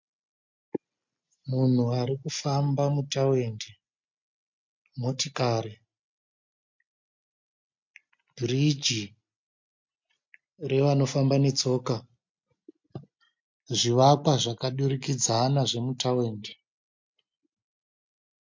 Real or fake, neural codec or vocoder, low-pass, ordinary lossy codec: real; none; 7.2 kHz; MP3, 48 kbps